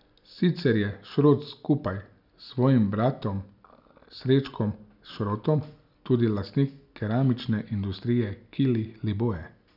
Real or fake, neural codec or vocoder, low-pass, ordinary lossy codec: real; none; 5.4 kHz; none